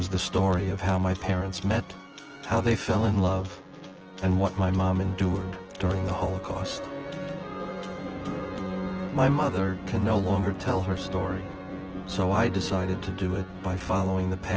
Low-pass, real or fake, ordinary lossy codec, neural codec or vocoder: 7.2 kHz; fake; Opus, 16 kbps; vocoder, 24 kHz, 100 mel bands, Vocos